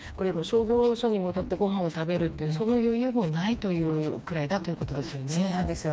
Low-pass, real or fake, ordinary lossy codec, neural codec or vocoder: none; fake; none; codec, 16 kHz, 2 kbps, FreqCodec, smaller model